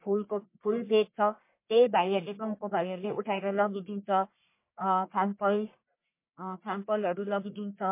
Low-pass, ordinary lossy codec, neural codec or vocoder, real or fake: 3.6 kHz; MP3, 24 kbps; codec, 44.1 kHz, 1.7 kbps, Pupu-Codec; fake